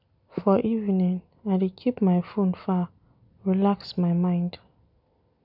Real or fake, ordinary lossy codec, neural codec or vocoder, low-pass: real; none; none; 5.4 kHz